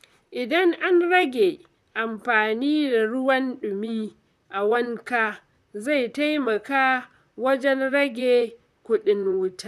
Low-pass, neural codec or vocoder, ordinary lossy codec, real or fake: 14.4 kHz; vocoder, 44.1 kHz, 128 mel bands, Pupu-Vocoder; none; fake